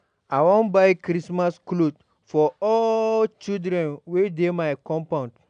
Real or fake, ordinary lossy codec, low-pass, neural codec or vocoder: real; AAC, 96 kbps; 9.9 kHz; none